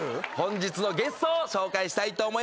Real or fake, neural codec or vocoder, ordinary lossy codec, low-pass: real; none; none; none